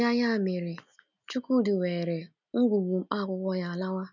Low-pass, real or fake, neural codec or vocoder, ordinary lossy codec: 7.2 kHz; real; none; none